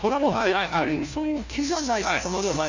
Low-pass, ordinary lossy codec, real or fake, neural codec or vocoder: 7.2 kHz; none; fake; codec, 16 kHz, 1 kbps, FunCodec, trained on LibriTTS, 50 frames a second